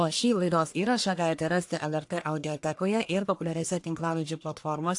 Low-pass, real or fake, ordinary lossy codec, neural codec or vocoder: 10.8 kHz; fake; AAC, 64 kbps; codec, 44.1 kHz, 1.7 kbps, Pupu-Codec